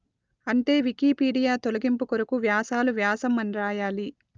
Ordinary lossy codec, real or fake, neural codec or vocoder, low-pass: Opus, 24 kbps; real; none; 7.2 kHz